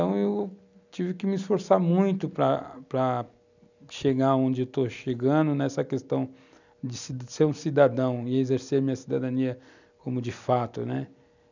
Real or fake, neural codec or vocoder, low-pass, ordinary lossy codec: real; none; 7.2 kHz; none